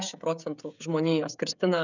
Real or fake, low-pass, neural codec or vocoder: fake; 7.2 kHz; codec, 16 kHz, 16 kbps, FreqCodec, smaller model